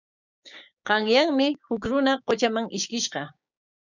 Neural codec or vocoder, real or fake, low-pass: codec, 16 kHz, 6 kbps, DAC; fake; 7.2 kHz